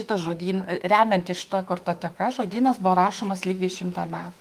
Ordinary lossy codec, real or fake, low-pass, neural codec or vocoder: Opus, 32 kbps; fake; 14.4 kHz; autoencoder, 48 kHz, 32 numbers a frame, DAC-VAE, trained on Japanese speech